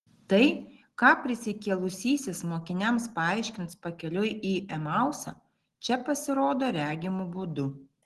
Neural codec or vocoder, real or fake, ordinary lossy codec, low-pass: none; real; Opus, 16 kbps; 10.8 kHz